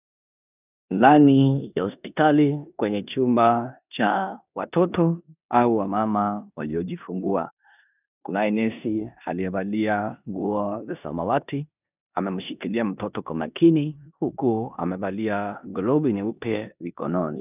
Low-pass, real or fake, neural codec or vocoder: 3.6 kHz; fake; codec, 16 kHz in and 24 kHz out, 0.9 kbps, LongCat-Audio-Codec, four codebook decoder